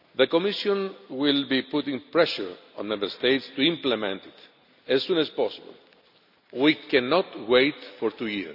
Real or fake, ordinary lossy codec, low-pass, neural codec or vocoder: real; none; 5.4 kHz; none